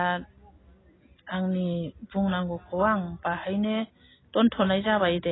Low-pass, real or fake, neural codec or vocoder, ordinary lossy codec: 7.2 kHz; real; none; AAC, 16 kbps